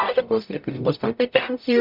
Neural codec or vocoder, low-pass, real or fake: codec, 44.1 kHz, 0.9 kbps, DAC; 5.4 kHz; fake